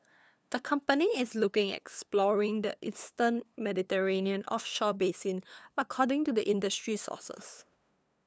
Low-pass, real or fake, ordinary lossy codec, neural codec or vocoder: none; fake; none; codec, 16 kHz, 2 kbps, FunCodec, trained on LibriTTS, 25 frames a second